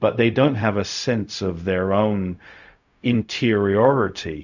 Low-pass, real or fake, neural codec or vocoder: 7.2 kHz; fake; codec, 16 kHz, 0.4 kbps, LongCat-Audio-Codec